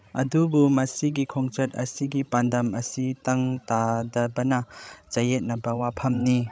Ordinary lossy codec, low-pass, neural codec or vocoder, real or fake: none; none; codec, 16 kHz, 16 kbps, FreqCodec, larger model; fake